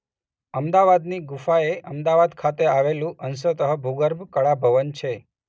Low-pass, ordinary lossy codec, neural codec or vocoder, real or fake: none; none; none; real